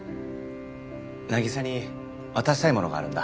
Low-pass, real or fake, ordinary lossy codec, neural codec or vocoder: none; real; none; none